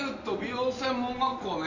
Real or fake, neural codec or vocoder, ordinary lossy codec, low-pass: real; none; none; 7.2 kHz